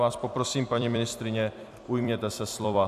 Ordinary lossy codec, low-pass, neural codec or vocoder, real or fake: AAC, 96 kbps; 14.4 kHz; vocoder, 44.1 kHz, 128 mel bands every 256 samples, BigVGAN v2; fake